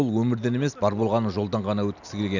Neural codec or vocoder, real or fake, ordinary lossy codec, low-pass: none; real; none; 7.2 kHz